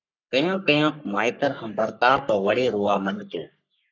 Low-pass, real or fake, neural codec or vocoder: 7.2 kHz; fake; codec, 44.1 kHz, 3.4 kbps, Pupu-Codec